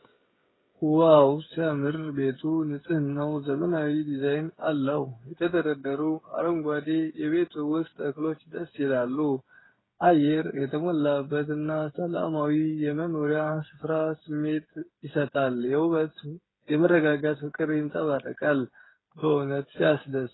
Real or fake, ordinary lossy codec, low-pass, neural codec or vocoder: fake; AAC, 16 kbps; 7.2 kHz; codec, 16 kHz, 8 kbps, FreqCodec, smaller model